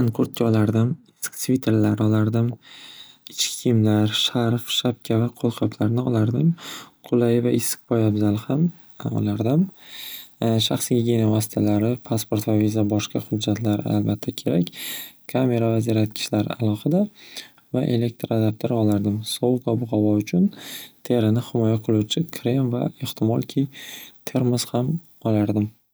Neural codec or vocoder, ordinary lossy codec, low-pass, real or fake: none; none; none; real